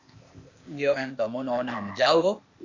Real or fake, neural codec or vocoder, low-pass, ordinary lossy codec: fake; codec, 16 kHz, 0.8 kbps, ZipCodec; 7.2 kHz; Opus, 64 kbps